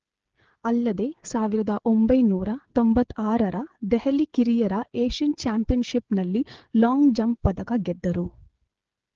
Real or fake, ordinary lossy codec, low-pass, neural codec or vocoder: fake; Opus, 16 kbps; 7.2 kHz; codec, 16 kHz, 8 kbps, FreqCodec, smaller model